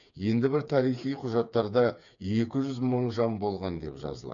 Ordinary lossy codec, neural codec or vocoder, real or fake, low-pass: none; codec, 16 kHz, 4 kbps, FreqCodec, smaller model; fake; 7.2 kHz